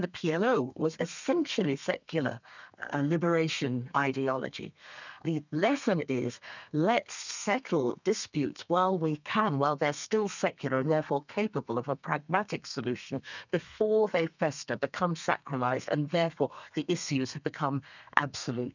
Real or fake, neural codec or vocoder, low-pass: fake; codec, 32 kHz, 1.9 kbps, SNAC; 7.2 kHz